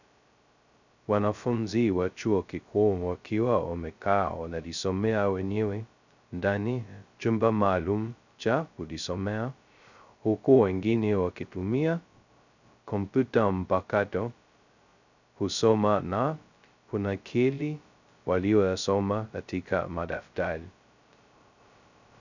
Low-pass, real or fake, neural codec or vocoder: 7.2 kHz; fake; codec, 16 kHz, 0.2 kbps, FocalCodec